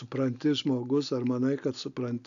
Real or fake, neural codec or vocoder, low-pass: real; none; 7.2 kHz